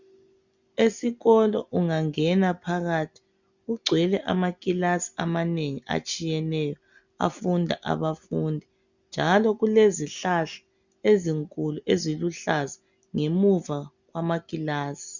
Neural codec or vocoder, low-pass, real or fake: none; 7.2 kHz; real